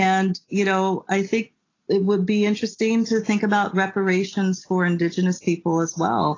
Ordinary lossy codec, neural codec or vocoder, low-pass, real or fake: AAC, 32 kbps; none; 7.2 kHz; real